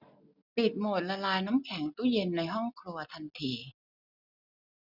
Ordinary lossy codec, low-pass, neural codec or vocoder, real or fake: none; 5.4 kHz; none; real